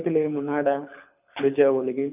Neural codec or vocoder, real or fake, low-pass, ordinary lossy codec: codec, 24 kHz, 6 kbps, HILCodec; fake; 3.6 kHz; none